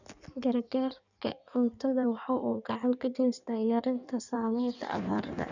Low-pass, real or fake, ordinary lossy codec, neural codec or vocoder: 7.2 kHz; fake; none; codec, 16 kHz in and 24 kHz out, 1.1 kbps, FireRedTTS-2 codec